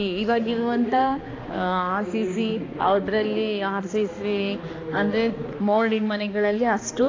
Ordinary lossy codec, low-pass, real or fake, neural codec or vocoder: AAC, 32 kbps; 7.2 kHz; fake; codec, 16 kHz, 2 kbps, X-Codec, HuBERT features, trained on balanced general audio